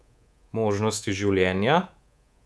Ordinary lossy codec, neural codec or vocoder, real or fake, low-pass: none; codec, 24 kHz, 3.1 kbps, DualCodec; fake; none